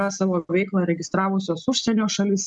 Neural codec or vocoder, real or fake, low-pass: none; real; 10.8 kHz